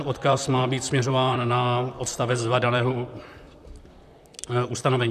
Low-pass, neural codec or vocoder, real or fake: 14.4 kHz; vocoder, 44.1 kHz, 128 mel bands, Pupu-Vocoder; fake